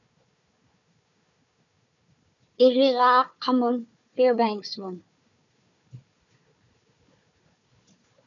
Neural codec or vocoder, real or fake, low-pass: codec, 16 kHz, 4 kbps, FunCodec, trained on Chinese and English, 50 frames a second; fake; 7.2 kHz